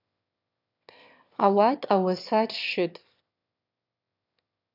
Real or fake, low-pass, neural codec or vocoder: fake; 5.4 kHz; autoencoder, 22.05 kHz, a latent of 192 numbers a frame, VITS, trained on one speaker